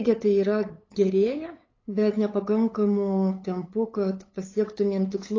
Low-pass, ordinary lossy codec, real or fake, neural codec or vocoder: 7.2 kHz; AAC, 32 kbps; fake; codec, 16 kHz, 8 kbps, FunCodec, trained on LibriTTS, 25 frames a second